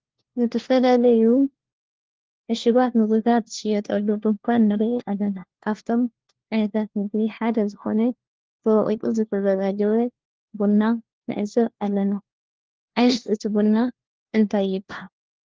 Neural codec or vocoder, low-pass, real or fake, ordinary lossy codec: codec, 16 kHz, 1 kbps, FunCodec, trained on LibriTTS, 50 frames a second; 7.2 kHz; fake; Opus, 16 kbps